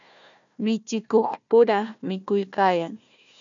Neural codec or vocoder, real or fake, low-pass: codec, 16 kHz, 1 kbps, FunCodec, trained on Chinese and English, 50 frames a second; fake; 7.2 kHz